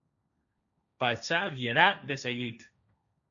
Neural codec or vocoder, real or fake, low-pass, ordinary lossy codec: codec, 16 kHz, 1.1 kbps, Voila-Tokenizer; fake; 7.2 kHz; MP3, 96 kbps